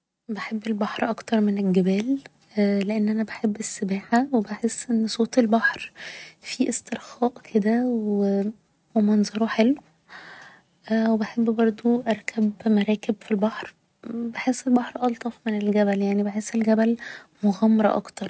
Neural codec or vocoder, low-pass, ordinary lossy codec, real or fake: none; none; none; real